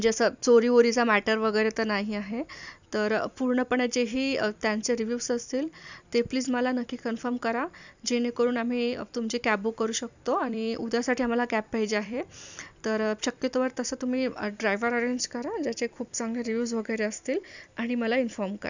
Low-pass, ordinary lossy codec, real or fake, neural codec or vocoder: 7.2 kHz; none; fake; vocoder, 44.1 kHz, 128 mel bands every 256 samples, BigVGAN v2